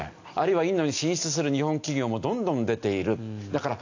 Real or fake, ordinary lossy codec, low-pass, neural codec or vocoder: real; AAC, 48 kbps; 7.2 kHz; none